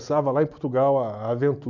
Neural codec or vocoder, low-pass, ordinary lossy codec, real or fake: none; 7.2 kHz; none; real